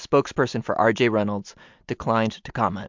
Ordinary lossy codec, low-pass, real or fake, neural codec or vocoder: MP3, 64 kbps; 7.2 kHz; real; none